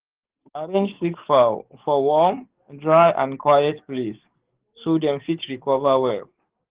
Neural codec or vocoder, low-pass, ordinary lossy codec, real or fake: codec, 44.1 kHz, 7.8 kbps, DAC; 3.6 kHz; Opus, 16 kbps; fake